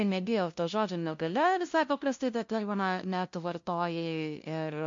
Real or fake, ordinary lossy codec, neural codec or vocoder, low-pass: fake; MP3, 48 kbps; codec, 16 kHz, 0.5 kbps, FunCodec, trained on LibriTTS, 25 frames a second; 7.2 kHz